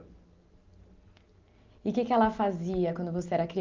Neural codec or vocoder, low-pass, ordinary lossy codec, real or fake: none; 7.2 kHz; Opus, 24 kbps; real